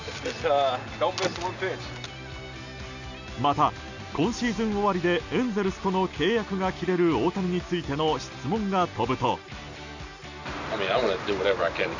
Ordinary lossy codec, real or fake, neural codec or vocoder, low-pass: none; real; none; 7.2 kHz